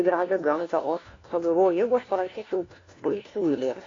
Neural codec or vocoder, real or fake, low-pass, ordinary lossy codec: codec, 16 kHz, 1 kbps, FunCodec, trained on LibriTTS, 50 frames a second; fake; 7.2 kHz; AAC, 32 kbps